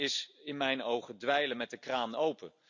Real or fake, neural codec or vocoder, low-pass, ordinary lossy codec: real; none; 7.2 kHz; none